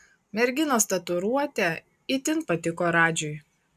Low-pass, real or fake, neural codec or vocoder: 14.4 kHz; real; none